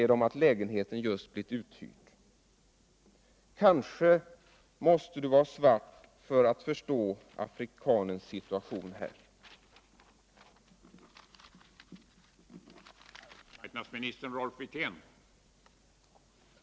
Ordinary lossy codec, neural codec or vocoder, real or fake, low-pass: none; none; real; none